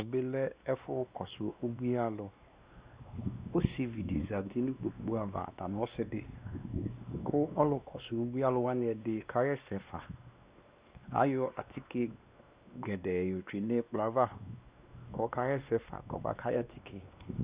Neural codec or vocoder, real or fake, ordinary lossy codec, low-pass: codec, 16 kHz, 2 kbps, X-Codec, WavLM features, trained on Multilingual LibriSpeech; fake; Opus, 64 kbps; 3.6 kHz